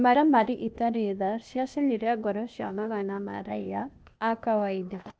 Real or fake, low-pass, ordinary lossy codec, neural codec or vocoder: fake; none; none; codec, 16 kHz, 0.8 kbps, ZipCodec